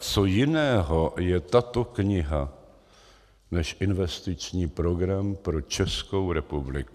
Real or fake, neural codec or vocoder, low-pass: real; none; 14.4 kHz